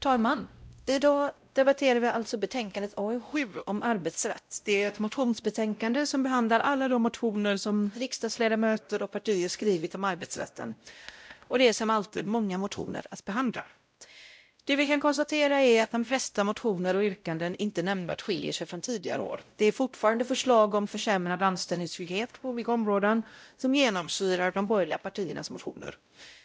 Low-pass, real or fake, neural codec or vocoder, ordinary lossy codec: none; fake; codec, 16 kHz, 0.5 kbps, X-Codec, WavLM features, trained on Multilingual LibriSpeech; none